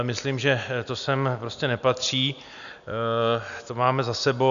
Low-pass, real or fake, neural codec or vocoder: 7.2 kHz; real; none